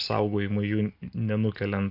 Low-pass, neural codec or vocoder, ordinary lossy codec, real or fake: 5.4 kHz; none; MP3, 48 kbps; real